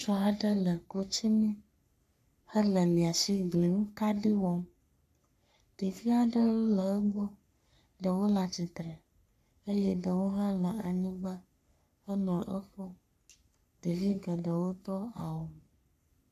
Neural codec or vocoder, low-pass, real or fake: codec, 44.1 kHz, 3.4 kbps, Pupu-Codec; 14.4 kHz; fake